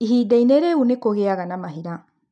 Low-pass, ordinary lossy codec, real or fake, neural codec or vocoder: 9.9 kHz; none; real; none